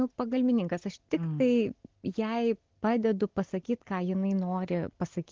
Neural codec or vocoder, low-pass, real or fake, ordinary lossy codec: none; 7.2 kHz; real; Opus, 16 kbps